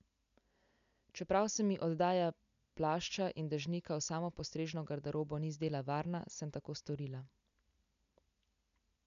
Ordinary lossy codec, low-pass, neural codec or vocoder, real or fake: none; 7.2 kHz; none; real